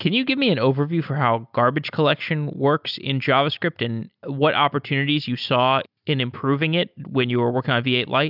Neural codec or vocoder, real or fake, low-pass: none; real; 5.4 kHz